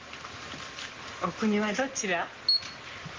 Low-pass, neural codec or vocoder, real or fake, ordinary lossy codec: 7.2 kHz; none; real; Opus, 16 kbps